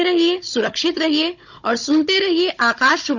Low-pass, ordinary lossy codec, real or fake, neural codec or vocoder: 7.2 kHz; none; fake; codec, 16 kHz, 16 kbps, FunCodec, trained on Chinese and English, 50 frames a second